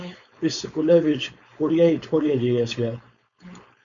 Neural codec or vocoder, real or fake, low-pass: codec, 16 kHz, 4.8 kbps, FACodec; fake; 7.2 kHz